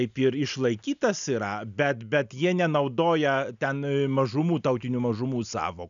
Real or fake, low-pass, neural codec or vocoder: real; 7.2 kHz; none